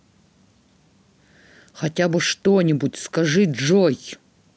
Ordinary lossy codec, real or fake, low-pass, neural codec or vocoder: none; real; none; none